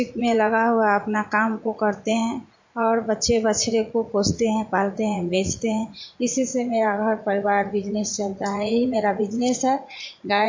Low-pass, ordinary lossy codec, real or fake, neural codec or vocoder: 7.2 kHz; MP3, 48 kbps; fake; vocoder, 44.1 kHz, 80 mel bands, Vocos